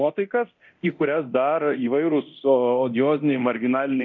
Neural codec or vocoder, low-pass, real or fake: codec, 24 kHz, 0.9 kbps, DualCodec; 7.2 kHz; fake